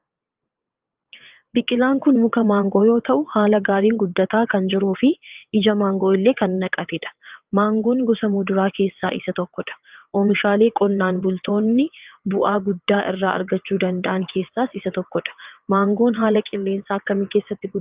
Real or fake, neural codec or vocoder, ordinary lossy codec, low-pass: fake; vocoder, 22.05 kHz, 80 mel bands, WaveNeXt; Opus, 24 kbps; 3.6 kHz